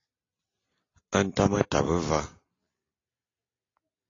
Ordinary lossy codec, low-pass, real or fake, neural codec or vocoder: AAC, 32 kbps; 7.2 kHz; real; none